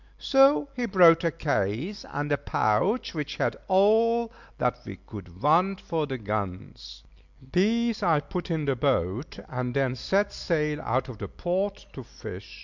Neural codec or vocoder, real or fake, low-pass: none; real; 7.2 kHz